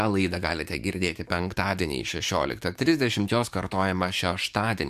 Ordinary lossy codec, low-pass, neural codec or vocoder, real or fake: MP3, 96 kbps; 14.4 kHz; codec, 44.1 kHz, 7.8 kbps, DAC; fake